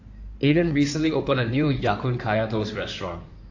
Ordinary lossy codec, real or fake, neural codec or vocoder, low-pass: AAC, 48 kbps; fake; codec, 16 kHz in and 24 kHz out, 2.2 kbps, FireRedTTS-2 codec; 7.2 kHz